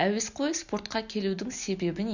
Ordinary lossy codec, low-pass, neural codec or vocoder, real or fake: none; 7.2 kHz; none; real